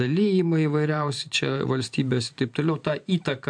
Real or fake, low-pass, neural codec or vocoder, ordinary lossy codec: fake; 9.9 kHz; vocoder, 44.1 kHz, 128 mel bands every 512 samples, BigVGAN v2; MP3, 64 kbps